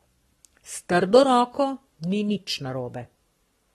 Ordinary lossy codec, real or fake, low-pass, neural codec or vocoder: AAC, 32 kbps; fake; 19.8 kHz; codec, 44.1 kHz, 7.8 kbps, Pupu-Codec